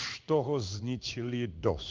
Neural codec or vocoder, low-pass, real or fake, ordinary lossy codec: codec, 16 kHz in and 24 kHz out, 1 kbps, XY-Tokenizer; 7.2 kHz; fake; Opus, 32 kbps